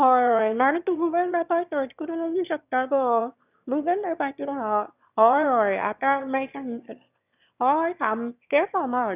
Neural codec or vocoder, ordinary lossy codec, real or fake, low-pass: autoencoder, 22.05 kHz, a latent of 192 numbers a frame, VITS, trained on one speaker; none; fake; 3.6 kHz